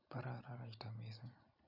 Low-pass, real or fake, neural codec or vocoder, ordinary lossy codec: 5.4 kHz; real; none; none